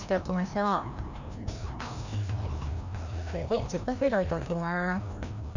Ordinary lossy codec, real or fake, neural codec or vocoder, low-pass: none; fake; codec, 16 kHz, 1 kbps, FreqCodec, larger model; 7.2 kHz